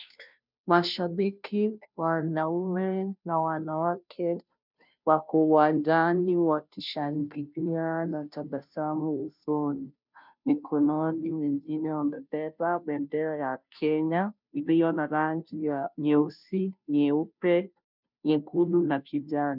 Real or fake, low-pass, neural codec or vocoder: fake; 5.4 kHz; codec, 16 kHz, 0.5 kbps, FunCodec, trained on Chinese and English, 25 frames a second